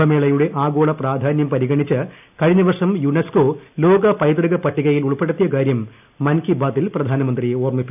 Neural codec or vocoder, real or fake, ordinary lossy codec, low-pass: none; real; none; 3.6 kHz